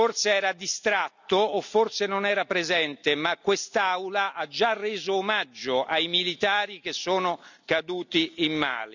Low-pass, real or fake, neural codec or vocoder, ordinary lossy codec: 7.2 kHz; real; none; none